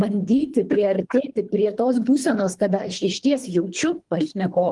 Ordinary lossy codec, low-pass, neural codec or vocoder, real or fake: Opus, 24 kbps; 10.8 kHz; codec, 24 kHz, 3 kbps, HILCodec; fake